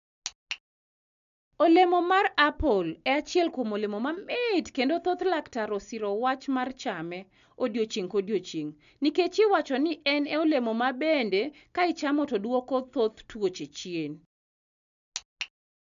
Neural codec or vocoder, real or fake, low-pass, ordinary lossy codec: none; real; 7.2 kHz; none